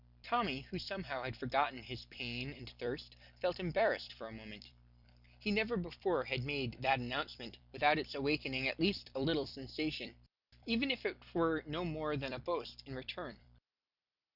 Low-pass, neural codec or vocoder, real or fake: 5.4 kHz; vocoder, 44.1 kHz, 128 mel bands every 256 samples, BigVGAN v2; fake